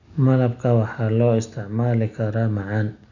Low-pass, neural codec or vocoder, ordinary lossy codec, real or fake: 7.2 kHz; none; none; real